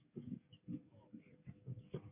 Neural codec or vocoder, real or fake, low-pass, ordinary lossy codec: codec, 44.1 kHz, 7.8 kbps, DAC; fake; 3.6 kHz; MP3, 24 kbps